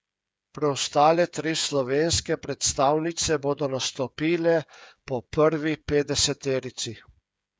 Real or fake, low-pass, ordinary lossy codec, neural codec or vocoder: fake; none; none; codec, 16 kHz, 8 kbps, FreqCodec, smaller model